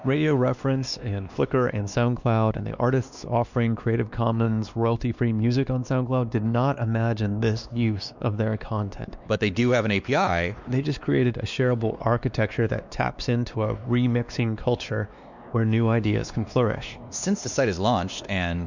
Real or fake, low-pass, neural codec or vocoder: fake; 7.2 kHz; codec, 16 kHz, 2 kbps, X-Codec, WavLM features, trained on Multilingual LibriSpeech